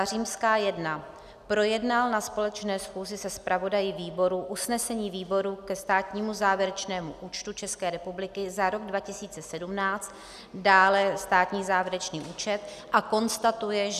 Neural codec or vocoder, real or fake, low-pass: none; real; 14.4 kHz